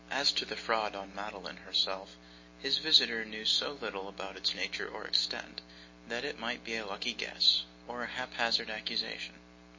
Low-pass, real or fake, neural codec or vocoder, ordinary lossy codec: 7.2 kHz; real; none; MP3, 32 kbps